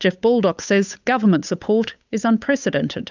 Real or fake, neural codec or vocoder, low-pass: fake; codec, 16 kHz, 8 kbps, FunCodec, trained on Chinese and English, 25 frames a second; 7.2 kHz